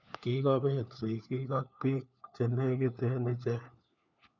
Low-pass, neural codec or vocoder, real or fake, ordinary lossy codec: 7.2 kHz; codec, 16 kHz, 4 kbps, FunCodec, trained on LibriTTS, 50 frames a second; fake; none